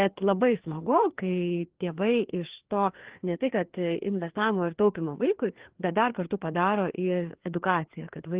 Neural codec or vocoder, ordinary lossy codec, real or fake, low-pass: codec, 16 kHz, 2 kbps, FreqCodec, larger model; Opus, 16 kbps; fake; 3.6 kHz